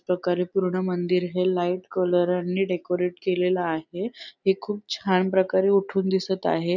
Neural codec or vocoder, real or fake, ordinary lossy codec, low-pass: none; real; none; 7.2 kHz